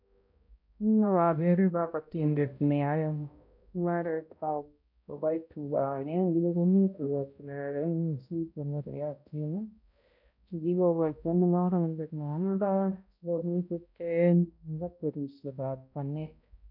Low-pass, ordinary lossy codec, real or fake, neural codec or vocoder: 5.4 kHz; none; fake; codec, 16 kHz, 0.5 kbps, X-Codec, HuBERT features, trained on balanced general audio